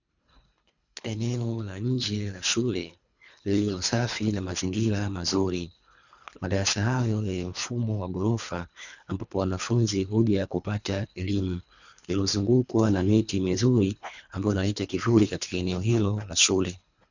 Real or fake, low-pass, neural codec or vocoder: fake; 7.2 kHz; codec, 24 kHz, 3 kbps, HILCodec